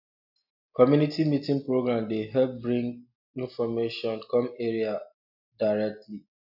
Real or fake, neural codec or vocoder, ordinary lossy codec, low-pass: real; none; AAC, 48 kbps; 5.4 kHz